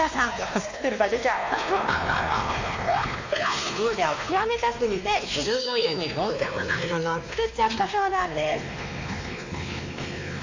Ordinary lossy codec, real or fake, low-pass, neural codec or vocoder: AAC, 48 kbps; fake; 7.2 kHz; codec, 16 kHz, 2 kbps, X-Codec, WavLM features, trained on Multilingual LibriSpeech